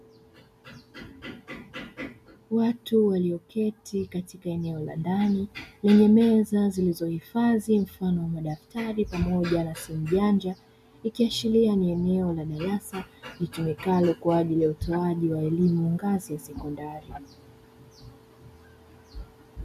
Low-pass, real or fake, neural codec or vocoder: 14.4 kHz; real; none